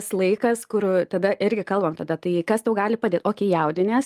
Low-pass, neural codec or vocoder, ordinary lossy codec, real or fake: 14.4 kHz; none; Opus, 32 kbps; real